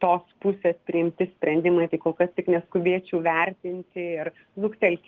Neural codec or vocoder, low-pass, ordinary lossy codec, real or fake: none; 7.2 kHz; Opus, 16 kbps; real